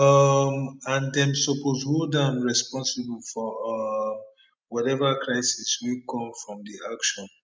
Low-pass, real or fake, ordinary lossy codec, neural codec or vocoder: none; real; none; none